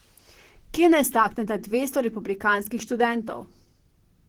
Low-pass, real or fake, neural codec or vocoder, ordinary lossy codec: 19.8 kHz; fake; vocoder, 44.1 kHz, 128 mel bands, Pupu-Vocoder; Opus, 16 kbps